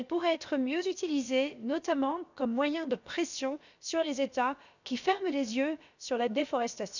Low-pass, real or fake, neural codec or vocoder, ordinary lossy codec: 7.2 kHz; fake; codec, 16 kHz, about 1 kbps, DyCAST, with the encoder's durations; none